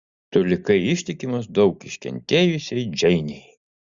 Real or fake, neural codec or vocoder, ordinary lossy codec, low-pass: real; none; Opus, 64 kbps; 7.2 kHz